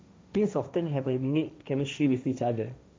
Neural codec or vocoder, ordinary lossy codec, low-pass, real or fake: codec, 16 kHz, 1.1 kbps, Voila-Tokenizer; none; none; fake